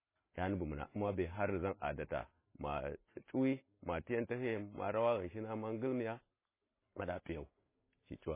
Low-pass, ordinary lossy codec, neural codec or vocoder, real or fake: 3.6 kHz; MP3, 16 kbps; none; real